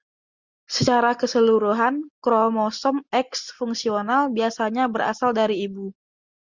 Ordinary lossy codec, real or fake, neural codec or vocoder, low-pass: Opus, 64 kbps; real; none; 7.2 kHz